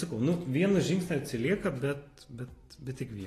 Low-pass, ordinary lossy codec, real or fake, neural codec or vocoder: 14.4 kHz; AAC, 48 kbps; real; none